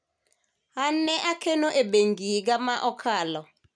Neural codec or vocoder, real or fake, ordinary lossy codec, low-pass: none; real; none; 9.9 kHz